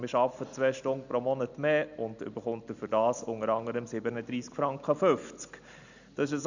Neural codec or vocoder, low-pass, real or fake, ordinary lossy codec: none; 7.2 kHz; real; none